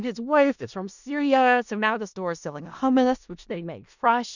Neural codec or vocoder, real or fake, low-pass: codec, 16 kHz in and 24 kHz out, 0.4 kbps, LongCat-Audio-Codec, four codebook decoder; fake; 7.2 kHz